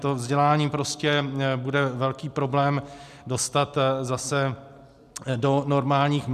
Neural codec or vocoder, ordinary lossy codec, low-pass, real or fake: none; AAC, 96 kbps; 14.4 kHz; real